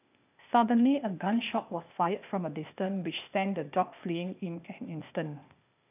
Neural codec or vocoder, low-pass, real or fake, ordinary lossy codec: codec, 16 kHz, 0.8 kbps, ZipCodec; 3.6 kHz; fake; none